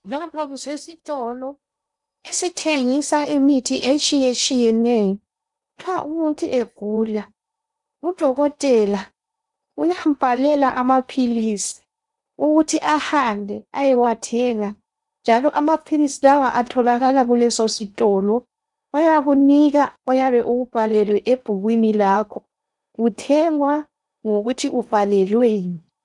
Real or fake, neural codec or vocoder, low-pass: fake; codec, 16 kHz in and 24 kHz out, 0.8 kbps, FocalCodec, streaming, 65536 codes; 10.8 kHz